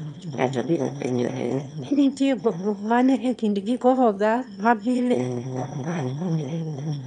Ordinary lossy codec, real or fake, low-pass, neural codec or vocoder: none; fake; 9.9 kHz; autoencoder, 22.05 kHz, a latent of 192 numbers a frame, VITS, trained on one speaker